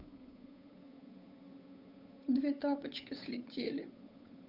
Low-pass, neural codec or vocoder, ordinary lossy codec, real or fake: 5.4 kHz; codec, 16 kHz, 8 kbps, FunCodec, trained on Chinese and English, 25 frames a second; none; fake